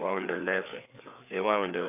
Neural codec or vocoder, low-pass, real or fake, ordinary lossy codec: codec, 16 kHz, 4 kbps, FreqCodec, larger model; 3.6 kHz; fake; none